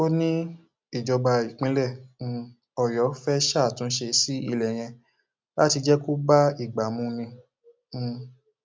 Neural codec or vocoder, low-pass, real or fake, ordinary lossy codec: none; none; real; none